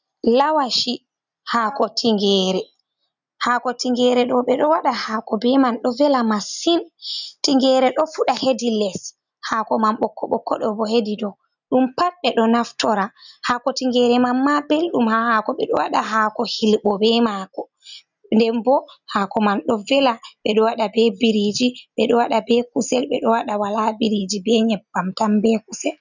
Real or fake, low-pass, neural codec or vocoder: real; 7.2 kHz; none